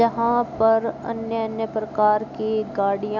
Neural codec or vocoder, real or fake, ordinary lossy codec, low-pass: none; real; none; 7.2 kHz